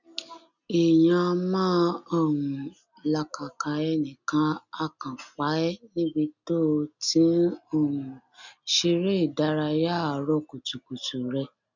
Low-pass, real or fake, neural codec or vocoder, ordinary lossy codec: 7.2 kHz; real; none; none